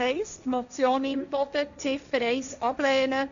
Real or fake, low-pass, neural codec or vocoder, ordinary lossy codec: fake; 7.2 kHz; codec, 16 kHz, 1.1 kbps, Voila-Tokenizer; none